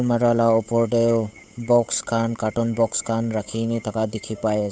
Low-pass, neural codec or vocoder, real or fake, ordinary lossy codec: none; codec, 16 kHz, 8 kbps, FunCodec, trained on Chinese and English, 25 frames a second; fake; none